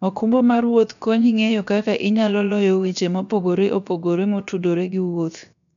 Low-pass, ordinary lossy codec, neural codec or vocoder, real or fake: 7.2 kHz; none; codec, 16 kHz, 0.7 kbps, FocalCodec; fake